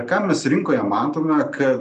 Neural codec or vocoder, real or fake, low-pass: none; real; 10.8 kHz